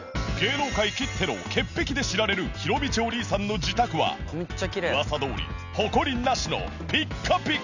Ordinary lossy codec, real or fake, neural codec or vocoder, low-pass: none; real; none; 7.2 kHz